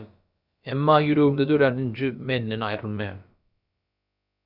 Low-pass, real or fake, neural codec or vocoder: 5.4 kHz; fake; codec, 16 kHz, about 1 kbps, DyCAST, with the encoder's durations